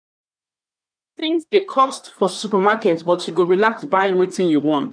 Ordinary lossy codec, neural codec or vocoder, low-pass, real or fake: none; codec, 24 kHz, 1 kbps, SNAC; 9.9 kHz; fake